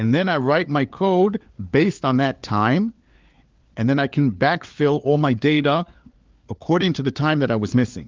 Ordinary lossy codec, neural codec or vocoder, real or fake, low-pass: Opus, 32 kbps; codec, 16 kHz, 4 kbps, FunCodec, trained on LibriTTS, 50 frames a second; fake; 7.2 kHz